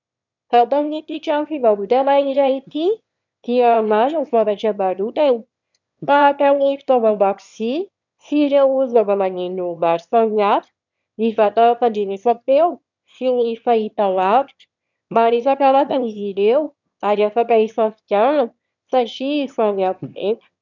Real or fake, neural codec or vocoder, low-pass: fake; autoencoder, 22.05 kHz, a latent of 192 numbers a frame, VITS, trained on one speaker; 7.2 kHz